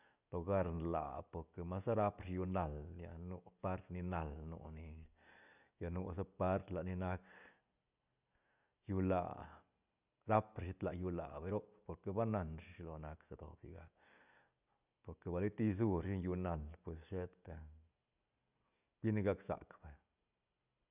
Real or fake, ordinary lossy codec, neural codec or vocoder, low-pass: real; none; none; 3.6 kHz